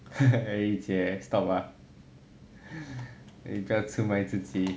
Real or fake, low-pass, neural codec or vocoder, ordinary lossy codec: real; none; none; none